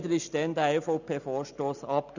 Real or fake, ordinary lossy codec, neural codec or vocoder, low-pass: real; none; none; 7.2 kHz